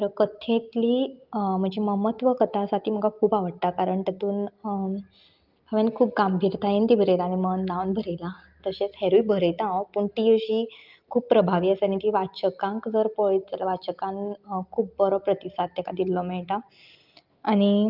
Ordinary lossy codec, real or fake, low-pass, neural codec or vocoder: Opus, 24 kbps; real; 5.4 kHz; none